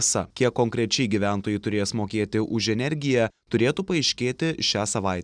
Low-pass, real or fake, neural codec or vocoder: 9.9 kHz; real; none